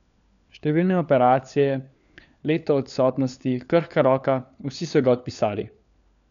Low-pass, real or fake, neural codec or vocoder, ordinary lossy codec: 7.2 kHz; fake; codec, 16 kHz, 4 kbps, FunCodec, trained on LibriTTS, 50 frames a second; none